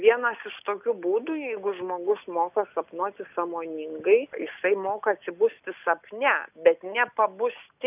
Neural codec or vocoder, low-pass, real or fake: none; 3.6 kHz; real